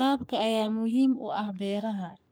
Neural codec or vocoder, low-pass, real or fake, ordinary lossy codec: codec, 44.1 kHz, 3.4 kbps, Pupu-Codec; none; fake; none